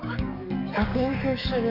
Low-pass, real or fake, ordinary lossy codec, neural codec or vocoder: 5.4 kHz; fake; none; codec, 44.1 kHz, 7.8 kbps, DAC